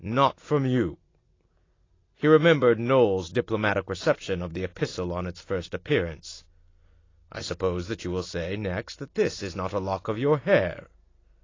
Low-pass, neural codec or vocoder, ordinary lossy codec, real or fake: 7.2 kHz; none; AAC, 32 kbps; real